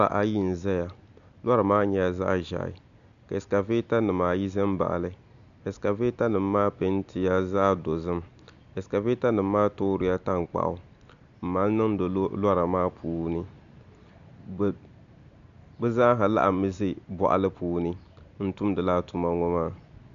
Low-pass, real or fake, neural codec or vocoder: 7.2 kHz; real; none